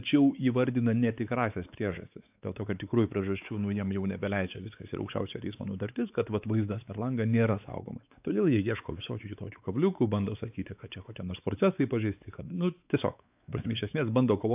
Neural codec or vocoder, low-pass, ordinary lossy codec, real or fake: codec, 16 kHz, 4 kbps, X-Codec, WavLM features, trained on Multilingual LibriSpeech; 3.6 kHz; AAC, 32 kbps; fake